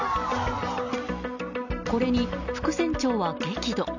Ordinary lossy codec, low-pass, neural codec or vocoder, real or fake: none; 7.2 kHz; none; real